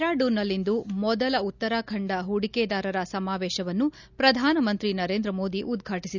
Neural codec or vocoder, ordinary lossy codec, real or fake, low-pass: none; none; real; 7.2 kHz